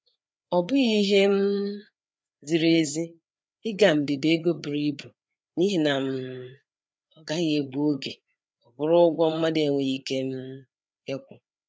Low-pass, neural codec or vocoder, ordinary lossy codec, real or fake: none; codec, 16 kHz, 4 kbps, FreqCodec, larger model; none; fake